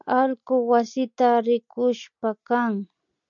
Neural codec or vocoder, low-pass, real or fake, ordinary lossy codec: none; 7.2 kHz; real; MP3, 96 kbps